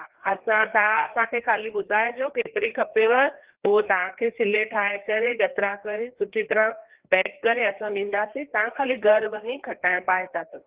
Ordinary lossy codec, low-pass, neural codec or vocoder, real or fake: Opus, 16 kbps; 3.6 kHz; codec, 16 kHz, 2 kbps, FreqCodec, larger model; fake